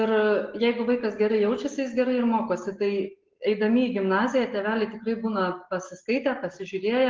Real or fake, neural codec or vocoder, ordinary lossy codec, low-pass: real; none; Opus, 16 kbps; 7.2 kHz